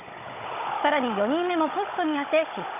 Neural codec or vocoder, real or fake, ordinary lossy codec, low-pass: codec, 16 kHz, 16 kbps, FunCodec, trained on Chinese and English, 50 frames a second; fake; none; 3.6 kHz